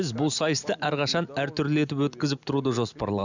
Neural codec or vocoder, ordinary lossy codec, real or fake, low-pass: none; none; real; 7.2 kHz